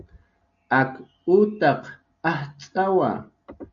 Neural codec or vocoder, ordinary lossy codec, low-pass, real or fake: none; MP3, 96 kbps; 7.2 kHz; real